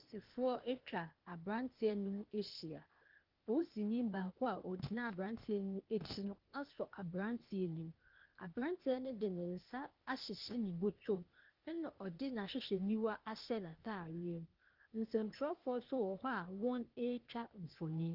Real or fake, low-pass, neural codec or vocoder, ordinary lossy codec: fake; 5.4 kHz; codec, 16 kHz, 0.8 kbps, ZipCodec; Opus, 32 kbps